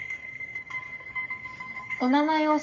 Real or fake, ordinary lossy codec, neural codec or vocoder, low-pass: fake; none; codec, 44.1 kHz, 7.8 kbps, Pupu-Codec; 7.2 kHz